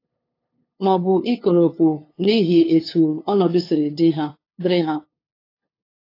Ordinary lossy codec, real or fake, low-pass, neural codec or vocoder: AAC, 32 kbps; fake; 5.4 kHz; codec, 16 kHz, 2 kbps, FunCodec, trained on LibriTTS, 25 frames a second